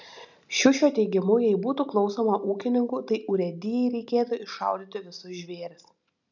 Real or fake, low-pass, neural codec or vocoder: real; 7.2 kHz; none